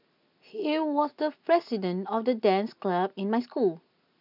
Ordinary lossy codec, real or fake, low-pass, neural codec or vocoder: none; real; 5.4 kHz; none